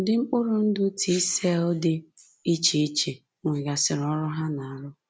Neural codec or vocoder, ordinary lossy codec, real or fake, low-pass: none; none; real; none